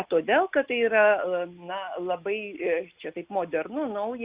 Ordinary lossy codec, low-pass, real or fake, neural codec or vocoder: Opus, 64 kbps; 3.6 kHz; real; none